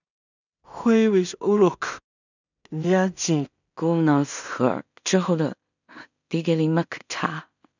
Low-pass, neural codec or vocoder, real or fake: 7.2 kHz; codec, 16 kHz in and 24 kHz out, 0.4 kbps, LongCat-Audio-Codec, two codebook decoder; fake